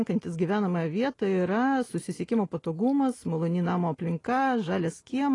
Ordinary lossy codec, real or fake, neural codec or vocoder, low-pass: AAC, 32 kbps; real; none; 10.8 kHz